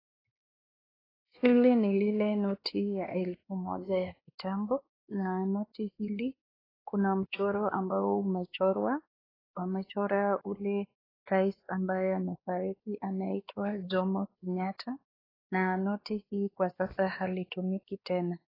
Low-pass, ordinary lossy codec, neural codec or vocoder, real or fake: 5.4 kHz; AAC, 24 kbps; codec, 16 kHz, 2 kbps, X-Codec, WavLM features, trained on Multilingual LibriSpeech; fake